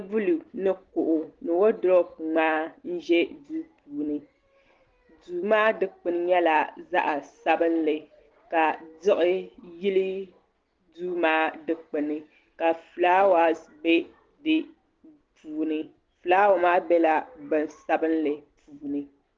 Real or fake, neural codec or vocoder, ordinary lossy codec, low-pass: real; none; Opus, 16 kbps; 7.2 kHz